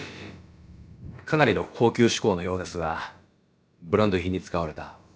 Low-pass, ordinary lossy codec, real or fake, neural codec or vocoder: none; none; fake; codec, 16 kHz, about 1 kbps, DyCAST, with the encoder's durations